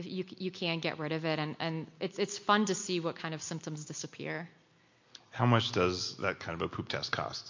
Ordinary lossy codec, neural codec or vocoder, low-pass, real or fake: MP3, 48 kbps; none; 7.2 kHz; real